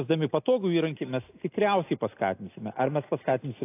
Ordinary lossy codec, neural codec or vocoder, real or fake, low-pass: AAC, 24 kbps; none; real; 3.6 kHz